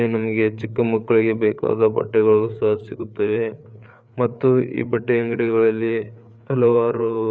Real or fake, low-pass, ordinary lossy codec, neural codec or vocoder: fake; none; none; codec, 16 kHz, 4 kbps, FreqCodec, larger model